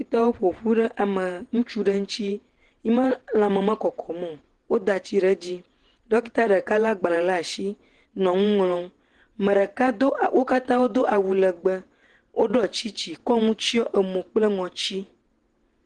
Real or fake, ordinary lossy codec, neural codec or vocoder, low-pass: fake; Opus, 16 kbps; vocoder, 48 kHz, 128 mel bands, Vocos; 10.8 kHz